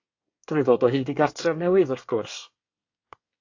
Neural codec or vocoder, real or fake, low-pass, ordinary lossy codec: codec, 24 kHz, 1 kbps, SNAC; fake; 7.2 kHz; AAC, 48 kbps